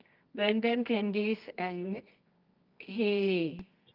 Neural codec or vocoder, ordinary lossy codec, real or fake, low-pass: codec, 24 kHz, 0.9 kbps, WavTokenizer, medium music audio release; Opus, 24 kbps; fake; 5.4 kHz